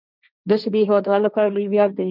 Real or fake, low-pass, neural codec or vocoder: fake; 5.4 kHz; codec, 16 kHz, 1.1 kbps, Voila-Tokenizer